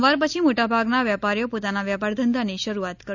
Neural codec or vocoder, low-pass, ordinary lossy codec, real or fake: none; 7.2 kHz; none; real